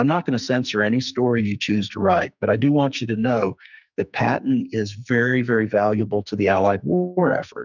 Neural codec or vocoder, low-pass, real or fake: codec, 44.1 kHz, 2.6 kbps, SNAC; 7.2 kHz; fake